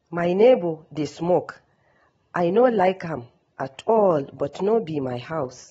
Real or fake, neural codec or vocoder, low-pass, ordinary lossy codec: real; none; 19.8 kHz; AAC, 24 kbps